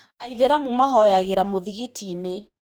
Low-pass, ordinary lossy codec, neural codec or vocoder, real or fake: none; none; codec, 44.1 kHz, 2.6 kbps, DAC; fake